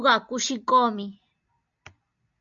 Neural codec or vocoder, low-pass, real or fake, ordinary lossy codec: none; 7.2 kHz; real; MP3, 64 kbps